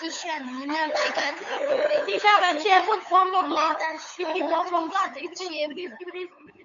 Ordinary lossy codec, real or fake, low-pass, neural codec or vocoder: AAC, 64 kbps; fake; 7.2 kHz; codec, 16 kHz, 8 kbps, FunCodec, trained on LibriTTS, 25 frames a second